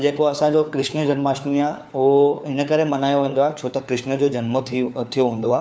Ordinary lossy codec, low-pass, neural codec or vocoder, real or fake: none; none; codec, 16 kHz, 4 kbps, FunCodec, trained on LibriTTS, 50 frames a second; fake